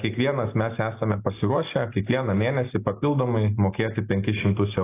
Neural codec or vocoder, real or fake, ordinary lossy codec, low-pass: none; real; AAC, 24 kbps; 3.6 kHz